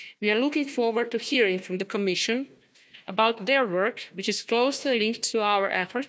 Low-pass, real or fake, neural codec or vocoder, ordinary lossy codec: none; fake; codec, 16 kHz, 1 kbps, FunCodec, trained on Chinese and English, 50 frames a second; none